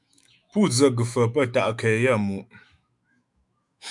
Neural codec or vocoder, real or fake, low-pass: autoencoder, 48 kHz, 128 numbers a frame, DAC-VAE, trained on Japanese speech; fake; 10.8 kHz